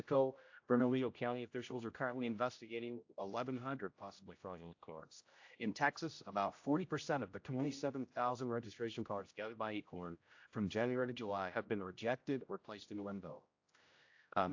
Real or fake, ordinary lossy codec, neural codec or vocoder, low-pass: fake; AAC, 48 kbps; codec, 16 kHz, 0.5 kbps, X-Codec, HuBERT features, trained on general audio; 7.2 kHz